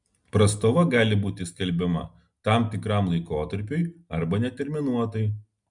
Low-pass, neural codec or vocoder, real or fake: 10.8 kHz; none; real